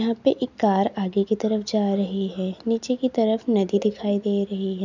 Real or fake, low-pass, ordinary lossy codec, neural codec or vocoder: real; 7.2 kHz; none; none